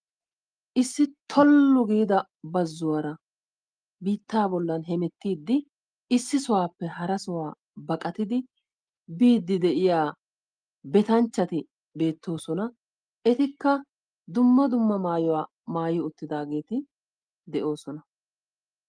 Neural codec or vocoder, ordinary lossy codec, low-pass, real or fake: none; Opus, 32 kbps; 9.9 kHz; real